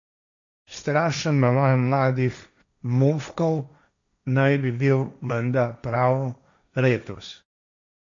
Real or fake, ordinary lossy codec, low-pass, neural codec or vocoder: fake; MP3, 64 kbps; 7.2 kHz; codec, 16 kHz, 1.1 kbps, Voila-Tokenizer